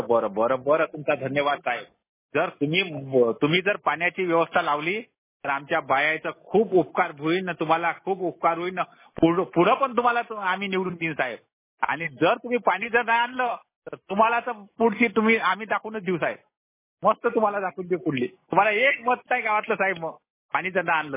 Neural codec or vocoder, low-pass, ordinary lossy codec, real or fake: none; 3.6 kHz; MP3, 16 kbps; real